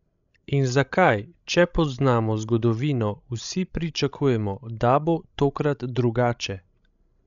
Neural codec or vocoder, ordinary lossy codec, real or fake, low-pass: codec, 16 kHz, 16 kbps, FreqCodec, larger model; none; fake; 7.2 kHz